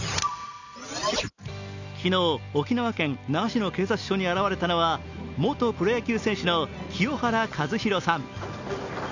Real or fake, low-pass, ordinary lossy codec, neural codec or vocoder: real; 7.2 kHz; none; none